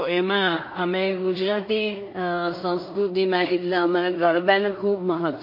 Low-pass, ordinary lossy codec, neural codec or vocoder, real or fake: 5.4 kHz; MP3, 32 kbps; codec, 16 kHz in and 24 kHz out, 0.4 kbps, LongCat-Audio-Codec, two codebook decoder; fake